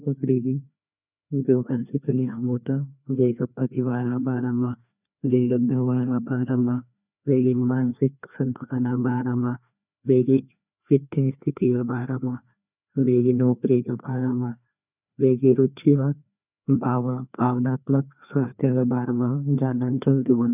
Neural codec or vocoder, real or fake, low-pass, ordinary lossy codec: codec, 16 kHz, 2 kbps, FreqCodec, larger model; fake; 3.6 kHz; none